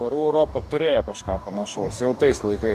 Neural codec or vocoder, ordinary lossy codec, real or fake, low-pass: codec, 44.1 kHz, 2.6 kbps, DAC; Opus, 64 kbps; fake; 14.4 kHz